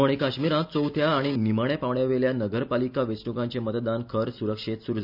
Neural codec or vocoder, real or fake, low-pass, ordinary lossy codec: none; real; 5.4 kHz; none